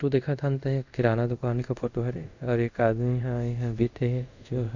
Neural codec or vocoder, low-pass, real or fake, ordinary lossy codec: codec, 24 kHz, 0.5 kbps, DualCodec; 7.2 kHz; fake; none